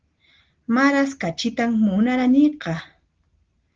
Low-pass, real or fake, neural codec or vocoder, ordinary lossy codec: 7.2 kHz; real; none; Opus, 16 kbps